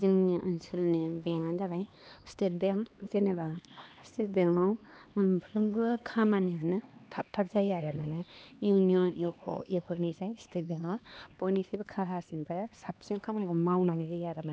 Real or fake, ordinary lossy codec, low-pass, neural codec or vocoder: fake; none; none; codec, 16 kHz, 2 kbps, X-Codec, HuBERT features, trained on LibriSpeech